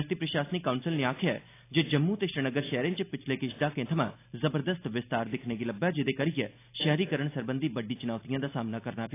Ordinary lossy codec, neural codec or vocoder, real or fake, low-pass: AAC, 24 kbps; none; real; 3.6 kHz